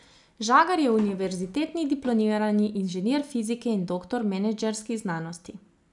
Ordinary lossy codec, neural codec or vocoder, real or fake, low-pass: none; none; real; 10.8 kHz